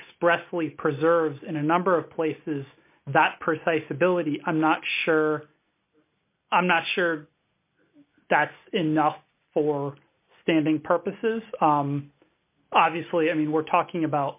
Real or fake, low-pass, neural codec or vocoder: real; 3.6 kHz; none